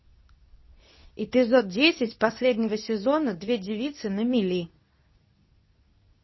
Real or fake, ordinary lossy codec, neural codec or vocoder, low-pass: fake; MP3, 24 kbps; codec, 24 kHz, 0.9 kbps, WavTokenizer, medium speech release version 2; 7.2 kHz